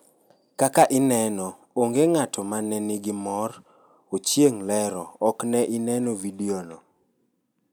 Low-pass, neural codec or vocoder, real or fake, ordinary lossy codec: none; none; real; none